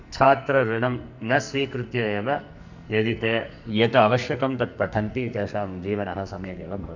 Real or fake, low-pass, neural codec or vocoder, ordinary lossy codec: fake; 7.2 kHz; codec, 44.1 kHz, 2.6 kbps, SNAC; none